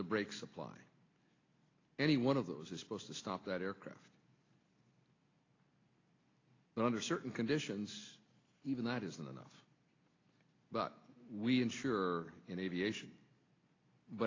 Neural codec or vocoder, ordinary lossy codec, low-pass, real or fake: none; AAC, 32 kbps; 7.2 kHz; real